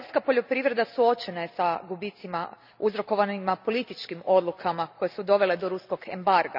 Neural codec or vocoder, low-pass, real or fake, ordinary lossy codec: none; 5.4 kHz; real; none